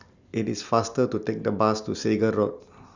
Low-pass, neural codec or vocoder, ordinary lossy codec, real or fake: 7.2 kHz; none; Opus, 64 kbps; real